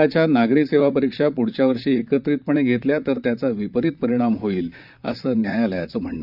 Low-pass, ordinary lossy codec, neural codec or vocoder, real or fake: 5.4 kHz; none; vocoder, 44.1 kHz, 128 mel bands, Pupu-Vocoder; fake